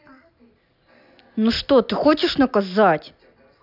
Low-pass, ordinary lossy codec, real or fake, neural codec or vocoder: 5.4 kHz; none; real; none